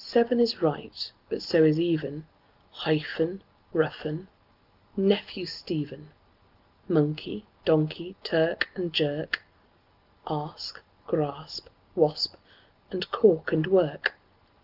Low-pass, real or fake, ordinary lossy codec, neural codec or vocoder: 5.4 kHz; real; Opus, 24 kbps; none